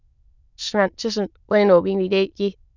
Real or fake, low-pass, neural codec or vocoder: fake; 7.2 kHz; autoencoder, 22.05 kHz, a latent of 192 numbers a frame, VITS, trained on many speakers